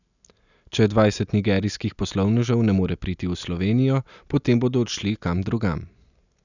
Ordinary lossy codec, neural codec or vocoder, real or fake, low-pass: none; none; real; 7.2 kHz